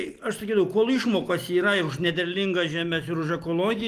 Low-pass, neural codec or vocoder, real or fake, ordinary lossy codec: 14.4 kHz; none; real; Opus, 24 kbps